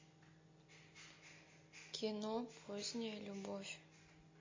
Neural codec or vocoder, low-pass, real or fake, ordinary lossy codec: none; 7.2 kHz; real; MP3, 32 kbps